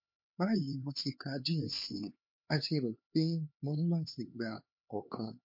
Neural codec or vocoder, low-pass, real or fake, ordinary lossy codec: codec, 16 kHz, 4 kbps, X-Codec, HuBERT features, trained on LibriSpeech; 5.4 kHz; fake; MP3, 32 kbps